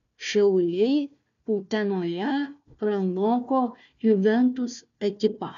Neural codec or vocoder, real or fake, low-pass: codec, 16 kHz, 1 kbps, FunCodec, trained on Chinese and English, 50 frames a second; fake; 7.2 kHz